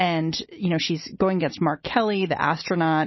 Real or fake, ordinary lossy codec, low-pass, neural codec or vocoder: real; MP3, 24 kbps; 7.2 kHz; none